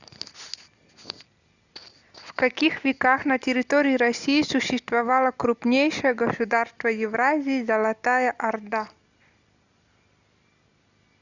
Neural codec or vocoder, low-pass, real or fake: none; 7.2 kHz; real